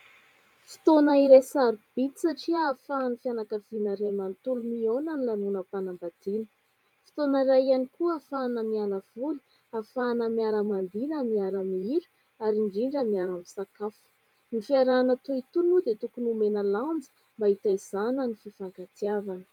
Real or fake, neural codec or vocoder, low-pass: fake; vocoder, 44.1 kHz, 128 mel bands every 512 samples, BigVGAN v2; 19.8 kHz